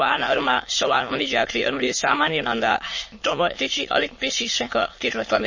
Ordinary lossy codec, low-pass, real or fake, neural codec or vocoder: MP3, 32 kbps; 7.2 kHz; fake; autoencoder, 22.05 kHz, a latent of 192 numbers a frame, VITS, trained on many speakers